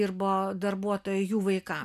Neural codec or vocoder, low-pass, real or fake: none; 14.4 kHz; real